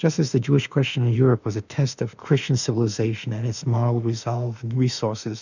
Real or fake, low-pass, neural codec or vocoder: fake; 7.2 kHz; autoencoder, 48 kHz, 32 numbers a frame, DAC-VAE, trained on Japanese speech